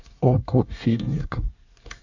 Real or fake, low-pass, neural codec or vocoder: fake; 7.2 kHz; codec, 24 kHz, 1 kbps, SNAC